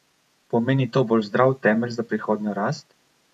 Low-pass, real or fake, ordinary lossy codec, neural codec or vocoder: 14.4 kHz; fake; AAC, 96 kbps; vocoder, 48 kHz, 128 mel bands, Vocos